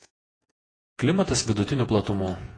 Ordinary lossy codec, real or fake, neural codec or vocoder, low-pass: AAC, 32 kbps; fake; vocoder, 48 kHz, 128 mel bands, Vocos; 9.9 kHz